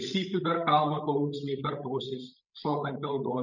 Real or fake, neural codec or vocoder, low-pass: fake; codec, 16 kHz, 16 kbps, FreqCodec, larger model; 7.2 kHz